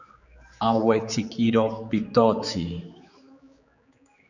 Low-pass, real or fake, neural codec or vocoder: 7.2 kHz; fake; codec, 16 kHz, 4 kbps, X-Codec, HuBERT features, trained on general audio